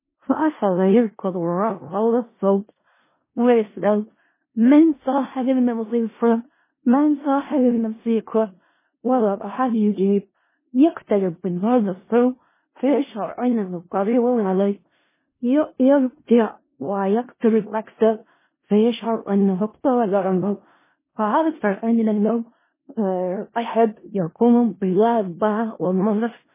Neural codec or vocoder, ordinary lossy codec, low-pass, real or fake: codec, 16 kHz in and 24 kHz out, 0.4 kbps, LongCat-Audio-Codec, four codebook decoder; MP3, 16 kbps; 3.6 kHz; fake